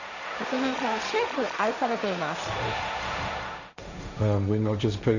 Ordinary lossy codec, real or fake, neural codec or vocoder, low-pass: none; fake; codec, 16 kHz, 1.1 kbps, Voila-Tokenizer; 7.2 kHz